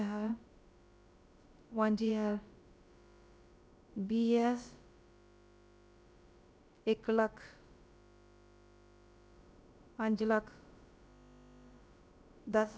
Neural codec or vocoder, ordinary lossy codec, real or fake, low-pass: codec, 16 kHz, about 1 kbps, DyCAST, with the encoder's durations; none; fake; none